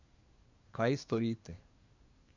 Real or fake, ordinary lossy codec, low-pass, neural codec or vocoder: fake; none; 7.2 kHz; codec, 24 kHz, 1 kbps, SNAC